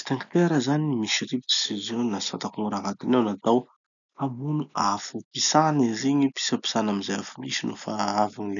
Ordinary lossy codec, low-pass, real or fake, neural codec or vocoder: none; 7.2 kHz; real; none